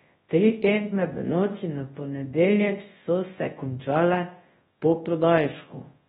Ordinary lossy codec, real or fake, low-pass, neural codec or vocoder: AAC, 16 kbps; fake; 10.8 kHz; codec, 24 kHz, 0.9 kbps, WavTokenizer, large speech release